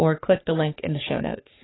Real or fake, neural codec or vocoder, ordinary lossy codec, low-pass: fake; codec, 44.1 kHz, 3.4 kbps, Pupu-Codec; AAC, 16 kbps; 7.2 kHz